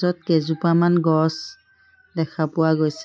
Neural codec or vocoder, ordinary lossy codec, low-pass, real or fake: none; none; none; real